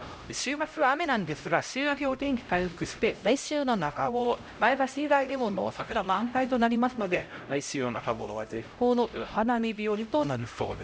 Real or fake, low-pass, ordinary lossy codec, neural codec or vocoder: fake; none; none; codec, 16 kHz, 0.5 kbps, X-Codec, HuBERT features, trained on LibriSpeech